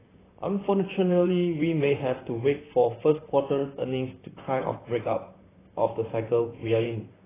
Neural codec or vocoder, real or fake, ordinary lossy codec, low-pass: vocoder, 44.1 kHz, 128 mel bands, Pupu-Vocoder; fake; AAC, 16 kbps; 3.6 kHz